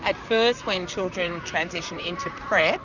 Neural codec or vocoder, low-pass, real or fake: vocoder, 44.1 kHz, 128 mel bands, Pupu-Vocoder; 7.2 kHz; fake